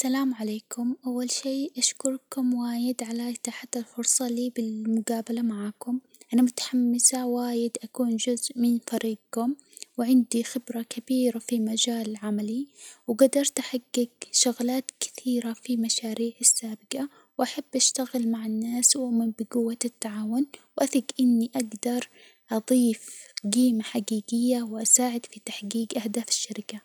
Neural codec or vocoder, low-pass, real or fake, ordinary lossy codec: none; none; real; none